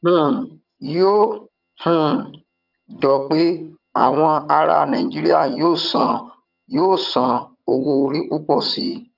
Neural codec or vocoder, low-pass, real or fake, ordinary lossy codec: vocoder, 22.05 kHz, 80 mel bands, HiFi-GAN; 5.4 kHz; fake; none